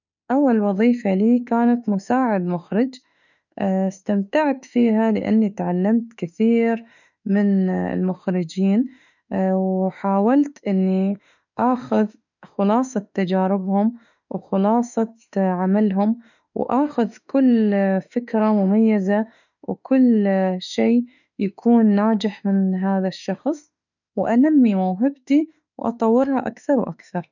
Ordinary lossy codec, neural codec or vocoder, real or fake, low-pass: none; autoencoder, 48 kHz, 32 numbers a frame, DAC-VAE, trained on Japanese speech; fake; 7.2 kHz